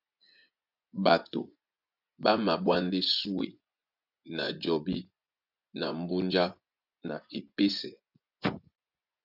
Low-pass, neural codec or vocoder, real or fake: 5.4 kHz; vocoder, 24 kHz, 100 mel bands, Vocos; fake